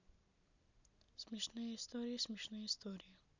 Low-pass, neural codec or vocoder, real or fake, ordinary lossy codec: 7.2 kHz; none; real; none